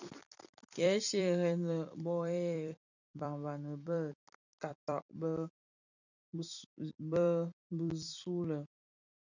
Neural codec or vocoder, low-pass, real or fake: vocoder, 44.1 kHz, 128 mel bands every 256 samples, BigVGAN v2; 7.2 kHz; fake